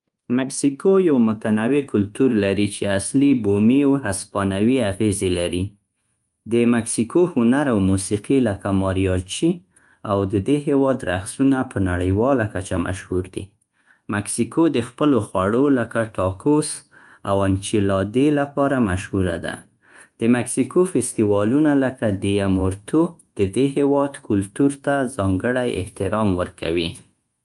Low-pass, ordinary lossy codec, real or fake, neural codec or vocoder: 10.8 kHz; Opus, 32 kbps; fake; codec, 24 kHz, 1.2 kbps, DualCodec